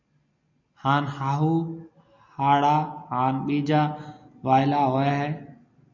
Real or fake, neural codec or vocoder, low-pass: real; none; 7.2 kHz